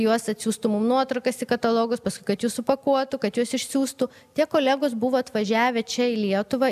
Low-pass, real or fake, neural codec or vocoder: 14.4 kHz; real; none